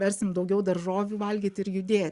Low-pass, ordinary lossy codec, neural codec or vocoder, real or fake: 10.8 kHz; Opus, 64 kbps; none; real